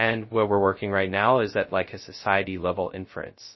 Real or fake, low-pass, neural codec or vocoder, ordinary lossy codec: fake; 7.2 kHz; codec, 16 kHz, 0.2 kbps, FocalCodec; MP3, 24 kbps